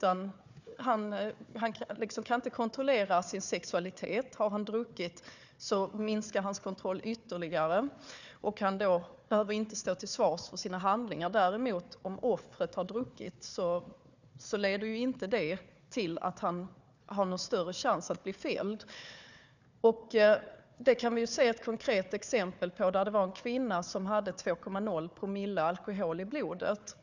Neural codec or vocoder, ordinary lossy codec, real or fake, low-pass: codec, 16 kHz, 4 kbps, FunCodec, trained on Chinese and English, 50 frames a second; none; fake; 7.2 kHz